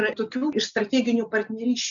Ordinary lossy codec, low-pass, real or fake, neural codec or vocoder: MP3, 96 kbps; 7.2 kHz; real; none